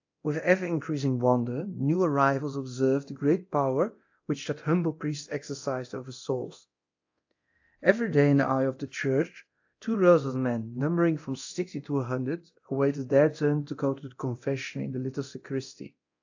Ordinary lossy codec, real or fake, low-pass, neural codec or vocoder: AAC, 48 kbps; fake; 7.2 kHz; codec, 24 kHz, 0.9 kbps, DualCodec